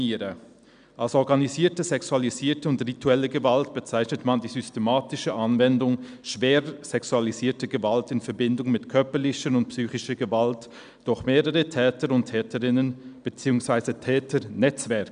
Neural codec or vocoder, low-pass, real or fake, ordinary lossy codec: none; 9.9 kHz; real; none